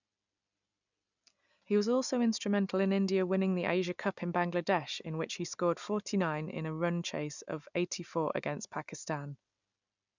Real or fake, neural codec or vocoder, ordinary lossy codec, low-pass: real; none; none; 7.2 kHz